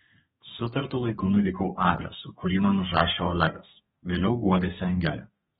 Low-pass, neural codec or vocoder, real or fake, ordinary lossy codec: 14.4 kHz; codec, 32 kHz, 1.9 kbps, SNAC; fake; AAC, 16 kbps